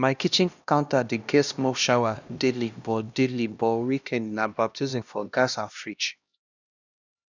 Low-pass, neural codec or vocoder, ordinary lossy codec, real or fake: 7.2 kHz; codec, 16 kHz, 1 kbps, X-Codec, HuBERT features, trained on LibriSpeech; none; fake